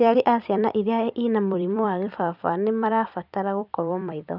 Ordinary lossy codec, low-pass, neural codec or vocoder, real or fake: none; 5.4 kHz; vocoder, 44.1 kHz, 128 mel bands every 256 samples, BigVGAN v2; fake